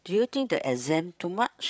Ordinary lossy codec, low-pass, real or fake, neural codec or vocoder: none; none; fake; codec, 16 kHz, 8 kbps, FreqCodec, larger model